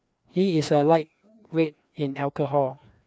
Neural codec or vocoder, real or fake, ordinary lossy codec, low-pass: codec, 16 kHz, 2 kbps, FreqCodec, larger model; fake; none; none